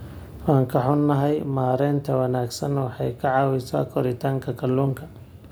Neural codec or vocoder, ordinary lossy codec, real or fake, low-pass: none; none; real; none